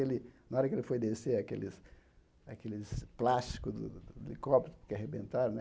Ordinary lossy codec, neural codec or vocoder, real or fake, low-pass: none; none; real; none